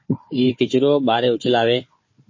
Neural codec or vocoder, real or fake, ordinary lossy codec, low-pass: codec, 16 kHz, 4 kbps, FunCodec, trained on Chinese and English, 50 frames a second; fake; MP3, 32 kbps; 7.2 kHz